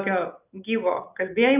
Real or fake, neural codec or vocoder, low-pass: real; none; 3.6 kHz